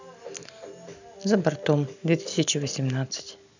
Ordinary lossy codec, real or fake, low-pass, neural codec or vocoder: none; real; 7.2 kHz; none